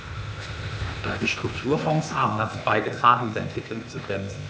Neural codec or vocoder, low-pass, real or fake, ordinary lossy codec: codec, 16 kHz, 0.8 kbps, ZipCodec; none; fake; none